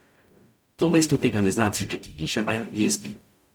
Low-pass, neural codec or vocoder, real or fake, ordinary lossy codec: none; codec, 44.1 kHz, 0.9 kbps, DAC; fake; none